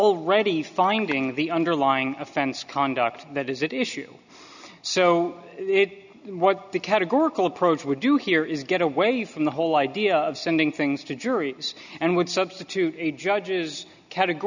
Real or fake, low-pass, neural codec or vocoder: real; 7.2 kHz; none